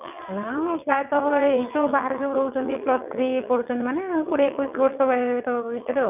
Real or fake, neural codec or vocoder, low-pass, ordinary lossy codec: fake; vocoder, 22.05 kHz, 80 mel bands, WaveNeXt; 3.6 kHz; none